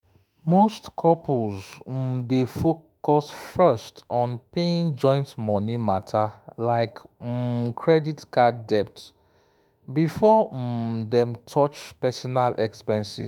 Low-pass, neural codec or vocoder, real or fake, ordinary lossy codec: none; autoencoder, 48 kHz, 32 numbers a frame, DAC-VAE, trained on Japanese speech; fake; none